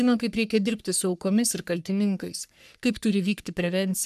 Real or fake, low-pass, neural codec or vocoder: fake; 14.4 kHz; codec, 44.1 kHz, 3.4 kbps, Pupu-Codec